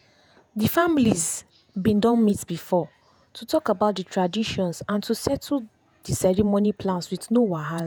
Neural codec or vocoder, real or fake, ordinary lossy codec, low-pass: vocoder, 48 kHz, 128 mel bands, Vocos; fake; none; none